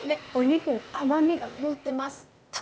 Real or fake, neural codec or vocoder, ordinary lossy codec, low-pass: fake; codec, 16 kHz, 0.8 kbps, ZipCodec; none; none